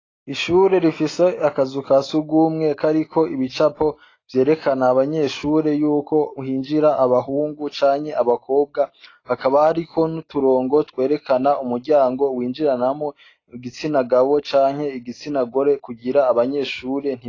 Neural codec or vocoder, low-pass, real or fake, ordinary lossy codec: none; 7.2 kHz; real; AAC, 32 kbps